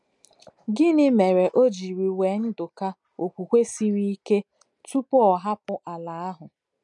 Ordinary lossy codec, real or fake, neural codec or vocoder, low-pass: none; real; none; 10.8 kHz